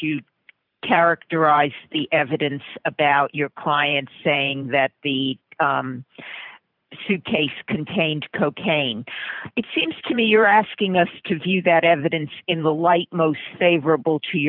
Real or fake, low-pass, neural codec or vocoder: fake; 5.4 kHz; vocoder, 44.1 kHz, 128 mel bands every 512 samples, BigVGAN v2